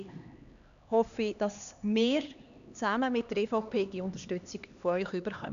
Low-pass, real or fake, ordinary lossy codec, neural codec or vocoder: 7.2 kHz; fake; none; codec, 16 kHz, 2 kbps, X-Codec, HuBERT features, trained on LibriSpeech